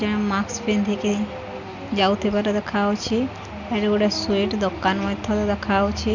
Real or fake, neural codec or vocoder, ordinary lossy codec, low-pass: real; none; none; 7.2 kHz